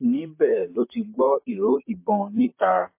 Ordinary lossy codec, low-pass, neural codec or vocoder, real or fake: MP3, 24 kbps; 3.6 kHz; vocoder, 44.1 kHz, 128 mel bands, Pupu-Vocoder; fake